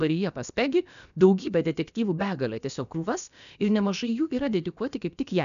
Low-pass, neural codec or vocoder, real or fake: 7.2 kHz; codec, 16 kHz, about 1 kbps, DyCAST, with the encoder's durations; fake